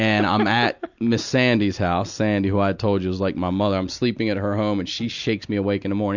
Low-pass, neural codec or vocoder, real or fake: 7.2 kHz; none; real